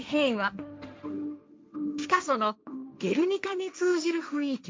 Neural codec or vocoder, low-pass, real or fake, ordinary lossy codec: codec, 16 kHz, 1.1 kbps, Voila-Tokenizer; none; fake; none